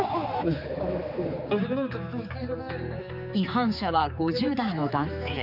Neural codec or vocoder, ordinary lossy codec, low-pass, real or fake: codec, 16 kHz, 4 kbps, X-Codec, HuBERT features, trained on general audio; none; 5.4 kHz; fake